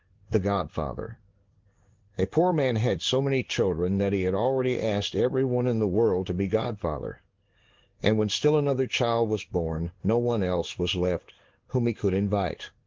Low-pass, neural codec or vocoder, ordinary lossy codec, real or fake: 7.2 kHz; codec, 16 kHz in and 24 kHz out, 1 kbps, XY-Tokenizer; Opus, 16 kbps; fake